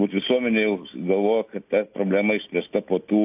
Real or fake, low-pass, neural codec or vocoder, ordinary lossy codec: real; 3.6 kHz; none; AAC, 32 kbps